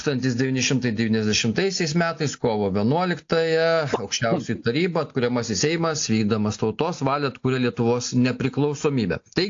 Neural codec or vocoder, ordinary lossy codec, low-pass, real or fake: none; AAC, 48 kbps; 7.2 kHz; real